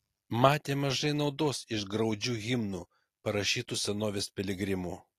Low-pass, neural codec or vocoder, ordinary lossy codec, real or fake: 14.4 kHz; none; AAC, 48 kbps; real